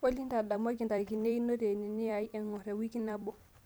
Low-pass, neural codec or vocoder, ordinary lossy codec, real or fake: none; vocoder, 44.1 kHz, 128 mel bands every 256 samples, BigVGAN v2; none; fake